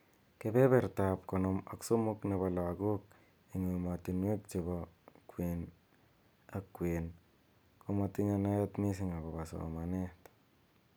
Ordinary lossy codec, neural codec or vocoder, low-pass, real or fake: none; none; none; real